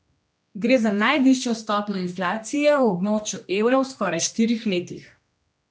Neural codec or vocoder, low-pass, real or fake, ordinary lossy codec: codec, 16 kHz, 1 kbps, X-Codec, HuBERT features, trained on general audio; none; fake; none